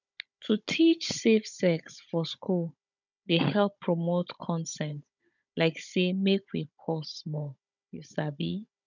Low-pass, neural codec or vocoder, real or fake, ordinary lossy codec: 7.2 kHz; codec, 16 kHz, 16 kbps, FunCodec, trained on Chinese and English, 50 frames a second; fake; none